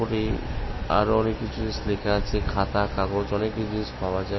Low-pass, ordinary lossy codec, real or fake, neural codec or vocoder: 7.2 kHz; MP3, 24 kbps; real; none